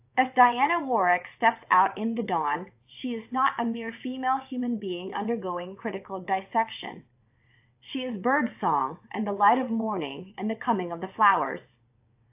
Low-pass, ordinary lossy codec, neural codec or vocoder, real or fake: 3.6 kHz; AAC, 32 kbps; vocoder, 22.05 kHz, 80 mel bands, WaveNeXt; fake